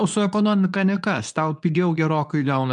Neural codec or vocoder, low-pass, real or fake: codec, 24 kHz, 0.9 kbps, WavTokenizer, medium speech release version 2; 10.8 kHz; fake